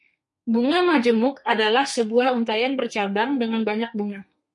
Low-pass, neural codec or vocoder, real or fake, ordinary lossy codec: 10.8 kHz; codec, 32 kHz, 1.9 kbps, SNAC; fake; MP3, 48 kbps